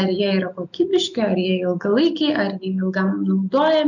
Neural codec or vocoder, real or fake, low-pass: none; real; 7.2 kHz